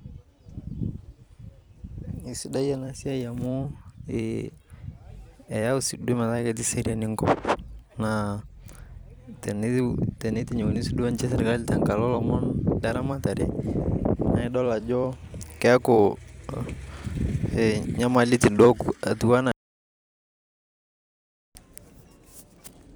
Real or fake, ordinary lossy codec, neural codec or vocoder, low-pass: fake; none; vocoder, 44.1 kHz, 128 mel bands every 256 samples, BigVGAN v2; none